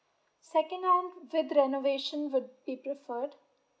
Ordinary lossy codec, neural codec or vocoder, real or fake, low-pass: none; none; real; none